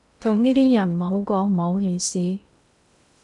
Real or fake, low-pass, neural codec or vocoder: fake; 10.8 kHz; codec, 16 kHz in and 24 kHz out, 0.6 kbps, FocalCodec, streaming, 2048 codes